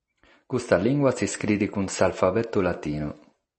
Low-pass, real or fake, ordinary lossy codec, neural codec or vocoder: 10.8 kHz; real; MP3, 32 kbps; none